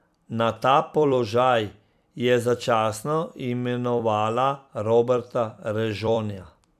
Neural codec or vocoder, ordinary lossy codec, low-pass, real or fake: vocoder, 44.1 kHz, 128 mel bands every 256 samples, BigVGAN v2; none; 14.4 kHz; fake